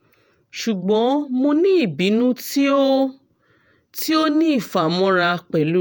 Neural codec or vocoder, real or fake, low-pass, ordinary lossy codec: vocoder, 48 kHz, 128 mel bands, Vocos; fake; none; none